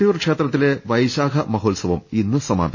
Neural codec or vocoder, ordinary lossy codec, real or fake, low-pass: none; MP3, 32 kbps; real; 7.2 kHz